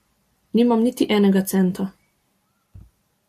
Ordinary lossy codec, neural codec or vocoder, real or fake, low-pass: AAC, 64 kbps; none; real; 14.4 kHz